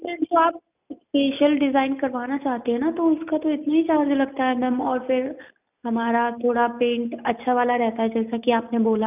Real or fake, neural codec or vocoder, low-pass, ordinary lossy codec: real; none; 3.6 kHz; none